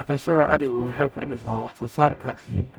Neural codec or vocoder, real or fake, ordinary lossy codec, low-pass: codec, 44.1 kHz, 0.9 kbps, DAC; fake; none; none